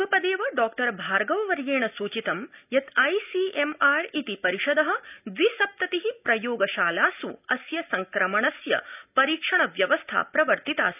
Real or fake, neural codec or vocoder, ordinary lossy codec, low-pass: real; none; none; 3.6 kHz